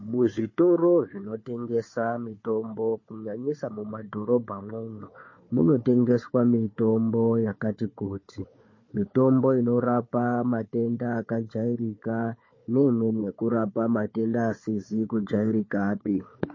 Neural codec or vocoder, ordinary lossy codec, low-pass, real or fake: codec, 16 kHz, 4 kbps, FunCodec, trained on Chinese and English, 50 frames a second; MP3, 32 kbps; 7.2 kHz; fake